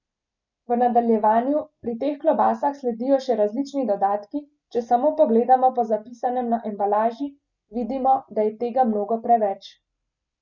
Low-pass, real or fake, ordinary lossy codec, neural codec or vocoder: 7.2 kHz; real; none; none